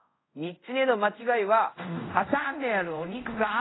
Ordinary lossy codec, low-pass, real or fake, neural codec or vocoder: AAC, 16 kbps; 7.2 kHz; fake; codec, 24 kHz, 0.5 kbps, DualCodec